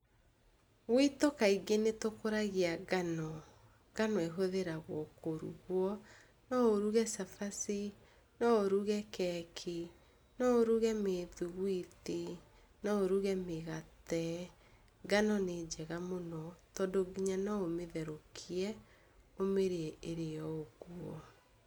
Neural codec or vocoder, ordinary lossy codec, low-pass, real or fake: none; none; none; real